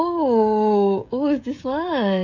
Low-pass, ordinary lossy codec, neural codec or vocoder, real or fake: 7.2 kHz; none; codec, 16 kHz, 16 kbps, FreqCodec, smaller model; fake